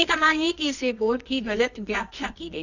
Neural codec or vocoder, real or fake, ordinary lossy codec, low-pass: codec, 24 kHz, 0.9 kbps, WavTokenizer, medium music audio release; fake; none; 7.2 kHz